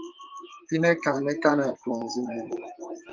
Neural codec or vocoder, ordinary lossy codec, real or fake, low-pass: vocoder, 44.1 kHz, 128 mel bands, Pupu-Vocoder; Opus, 32 kbps; fake; 7.2 kHz